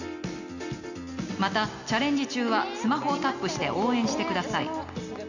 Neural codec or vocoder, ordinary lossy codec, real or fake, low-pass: none; none; real; 7.2 kHz